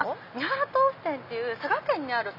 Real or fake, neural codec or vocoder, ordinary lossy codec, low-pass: real; none; none; 5.4 kHz